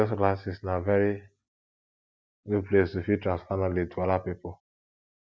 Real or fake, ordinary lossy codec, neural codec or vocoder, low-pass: real; none; none; none